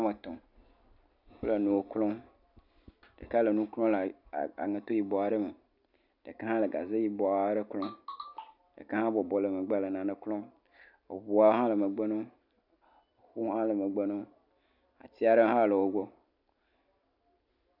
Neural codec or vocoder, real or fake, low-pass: none; real; 5.4 kHz